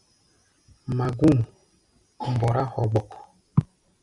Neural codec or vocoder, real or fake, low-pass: none; real; 10.8 kHz